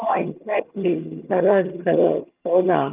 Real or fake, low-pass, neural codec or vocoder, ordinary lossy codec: fake; 3.6 kHz; vocoder, 22.05 kHz, 80 mel bands, HiFi-GAN; Opus, 24 kbps